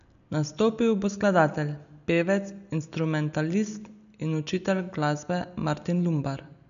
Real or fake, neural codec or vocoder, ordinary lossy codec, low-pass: real; none; none; 7.2 kHz